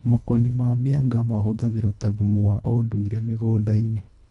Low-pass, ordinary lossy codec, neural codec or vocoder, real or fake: 10.8 kHz; none; codec, 24 kHz, 1.5 kbps, HILCodec; fake